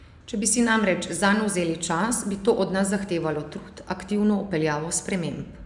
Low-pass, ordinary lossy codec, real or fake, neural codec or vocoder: 10.8 kHz; none; real; none